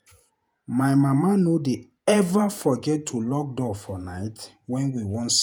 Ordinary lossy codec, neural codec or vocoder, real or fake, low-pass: none; vocoder, 48 kHz, 128 mel bands, Vocos; fake; none